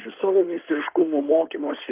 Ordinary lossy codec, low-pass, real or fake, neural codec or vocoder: Opus, 24 kbps; 3.6 kHz; fake; codec, 16 kHz in and 24 kHz out, 2.2 kbps, FireRedTTS-2 codec